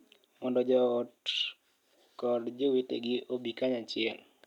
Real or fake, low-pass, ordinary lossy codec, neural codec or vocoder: real; 19.8 kHz; none; none